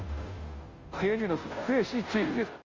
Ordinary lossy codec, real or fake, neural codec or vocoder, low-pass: Opus, 32 kbps; fake; codec, 16 kHz, 0.5 kbps, FunCodec, trained on Chinese and English, 25 frames a second; 7.2 kHz